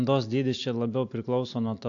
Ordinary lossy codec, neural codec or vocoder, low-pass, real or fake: AAC, 48 kbps; none; 7.2 kHz; real